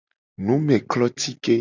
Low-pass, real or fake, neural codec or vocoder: 7.2 kHz; real; none